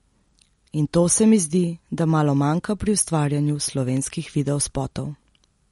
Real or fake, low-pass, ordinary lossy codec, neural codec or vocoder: real; 19.8 kHz; MP3, 48 kbps; none